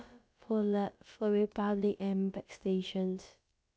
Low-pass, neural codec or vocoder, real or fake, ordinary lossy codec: none; codec, 16 kHz, about 1 kbps, DyCAST, with the encoder's durations; fake; none